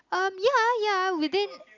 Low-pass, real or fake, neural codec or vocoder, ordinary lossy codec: 7.2 kHz; real; none; none